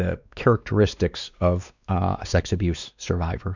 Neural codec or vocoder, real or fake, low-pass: codec, 16 kHz, 2 kbps, X-Codec, WavLM features, trained on Multilingual LibriSpeech; fake; 7.2 kHz